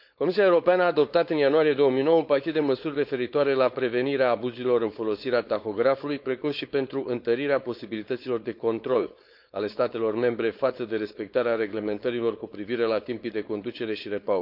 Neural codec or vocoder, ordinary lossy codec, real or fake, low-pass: codec, 16 kHz, 4.8 kbps, FACodec; none; fake; 5.4 kHz